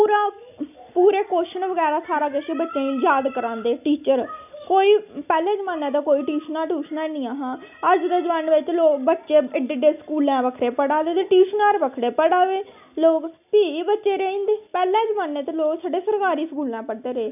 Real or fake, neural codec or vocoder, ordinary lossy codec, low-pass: real; none; none; 3.6 kHz